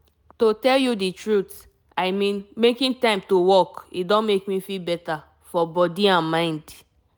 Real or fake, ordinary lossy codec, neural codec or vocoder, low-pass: real; none; none; none